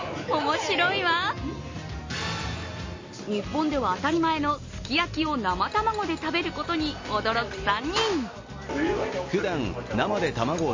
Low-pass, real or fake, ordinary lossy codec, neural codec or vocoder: 7.2 kHz; real; MP3, 32 kbps; none